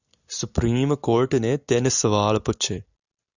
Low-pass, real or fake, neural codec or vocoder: 7.2 kHz; real; none